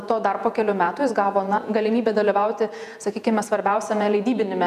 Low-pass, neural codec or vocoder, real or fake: 14.4 kHz; vocoder, 48 kHz, 128 mel bands, Vocos; fake